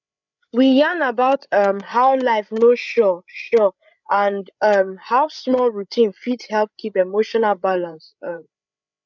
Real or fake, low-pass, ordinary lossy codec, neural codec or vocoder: fake; 7.2 kHz; none; codec, 16 kHz, 8 kbps, FreqCodec, larger model